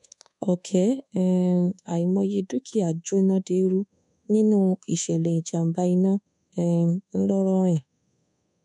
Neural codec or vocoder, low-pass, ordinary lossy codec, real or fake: codec, 24 kHz, 1.2 kbps, DualCodec; 10.8 kHz; none; fake